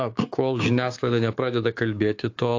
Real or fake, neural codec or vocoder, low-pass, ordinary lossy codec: fake; codec, 16 kHz, 6 kbps, DAC; 7.2 kHz; AAC, 48 kbps